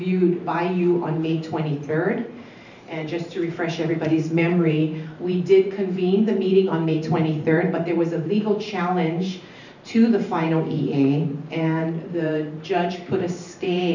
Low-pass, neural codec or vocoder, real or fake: 7.2 kHz; vocoder, 44.1 kHz, 128 mel bands every 512 samples, BigVGAN v2; fake